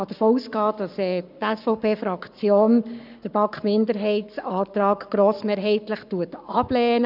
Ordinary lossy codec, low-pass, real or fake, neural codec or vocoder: none; 5.4 kHz; fake; codec, 44.1 kHz, 7.8 kbps, Pupu-Codec